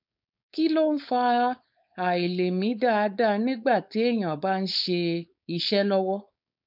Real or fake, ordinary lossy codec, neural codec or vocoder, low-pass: fake; none; codec, 16 kHz, 4.8 kbps, FACodec; 5.4 kHz